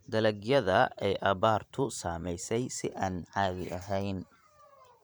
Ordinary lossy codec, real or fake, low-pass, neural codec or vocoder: none; fake; none; vocoder, 44.1 kHz, 128 mel bands every 512 samples, BigVGAN v2